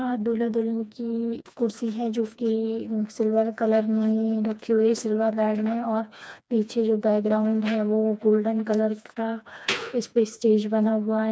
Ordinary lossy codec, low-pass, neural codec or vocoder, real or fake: none; none; codec, 16 kHz, 2 kbps, FreqCodec, smaller model; fake